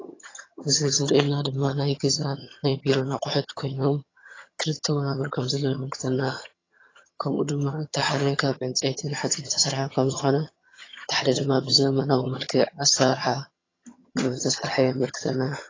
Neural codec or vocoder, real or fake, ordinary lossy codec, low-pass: vocoder, 22.05 kHz, 80 mel bands, HiFi-GAN; fake; AAC, 32 kbps; 7.2 kHz